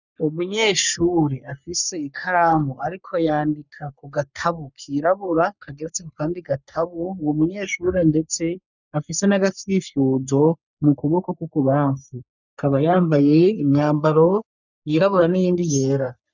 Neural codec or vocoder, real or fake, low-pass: codec, 44.1 kHz, 3.4 kbps, Pupu-Codec; fake; 7.2 kHz